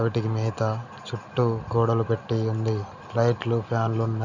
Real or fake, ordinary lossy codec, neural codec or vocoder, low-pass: real; none; none; 7.2 kHz